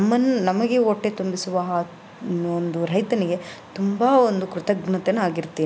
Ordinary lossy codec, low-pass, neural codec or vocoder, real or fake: none; none; none; real